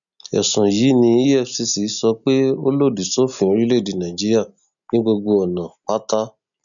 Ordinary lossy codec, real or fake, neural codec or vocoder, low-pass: none; real; none; 7.2 kHz